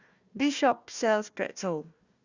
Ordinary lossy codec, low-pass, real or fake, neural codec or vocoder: Opus, 64 kbps; 7.2 kHz; fake; codec, 16 kHz, 1 kbps, FunCodec, trained on Chinese and English, 50 frames a second